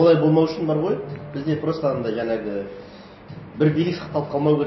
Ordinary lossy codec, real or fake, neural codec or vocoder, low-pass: MP3, 24 kbps; real; none; 7.2 kHz